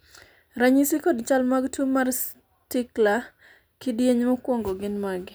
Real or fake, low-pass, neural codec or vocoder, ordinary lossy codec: real; none; none; none